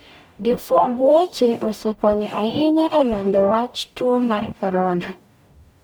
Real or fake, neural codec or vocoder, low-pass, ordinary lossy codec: fake; codec, 44.1 kHz, 0.9 kbps, DAC; none; none